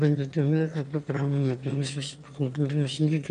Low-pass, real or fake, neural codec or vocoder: 9.9 kHz; fake; autoencoder, 22.05 kHz, a latent of 192 numbers a frame, VITS, trained on one speaker